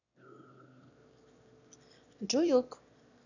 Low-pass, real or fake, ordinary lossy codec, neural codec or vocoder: 7.2 kHz; fake; none; autoencoder, 22.05 kHz, a latent of 192 numbers a frame, VITS, trained on one speaker